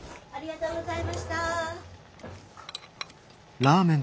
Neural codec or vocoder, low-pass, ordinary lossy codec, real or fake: none; none; none; real